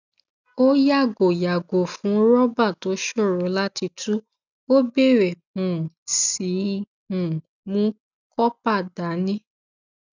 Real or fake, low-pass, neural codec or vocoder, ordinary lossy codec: real; 7.2 kHz; none; none